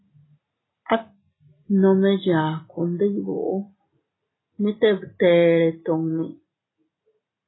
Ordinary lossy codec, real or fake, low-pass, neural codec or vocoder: AAC, 16 kbps; real; 7.2 kHz; none